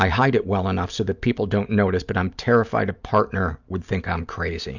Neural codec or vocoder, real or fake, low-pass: none; real; 7.2 kHz